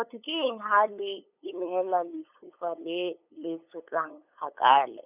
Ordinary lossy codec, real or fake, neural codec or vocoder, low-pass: none; fake; codec, 16 kHz, 8 kbps, FunCodec, trained on LibriTTS, 25 frames a second; 3.6 kHz